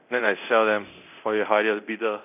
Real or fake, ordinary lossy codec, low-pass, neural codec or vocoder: fake; none; 3.6 kHz; codec, 24 kHz, 0.9 kbps, DualCodec